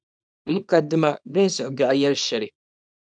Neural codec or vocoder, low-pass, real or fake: codec, 24 kHz, 0.9 kbps, WavTokenizer, small release; 9.9 kHz; fake